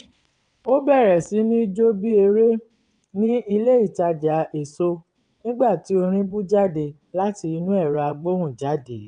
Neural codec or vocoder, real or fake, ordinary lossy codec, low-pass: vocoder, 22.05 kHz, 80 mel bands, WaveNeXt; fake; none; 9.9 kHz